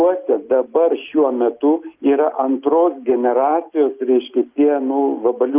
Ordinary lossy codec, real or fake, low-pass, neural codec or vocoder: Opus, 24 kbps; real; 3.6 kHz; none